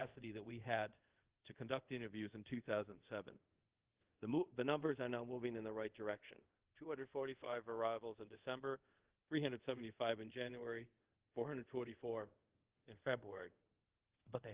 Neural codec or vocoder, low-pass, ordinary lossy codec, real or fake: codec, 24 kHz, 0.5 kbps, DualCodec; 3.6 kHz; Opus, 16 kbps; fake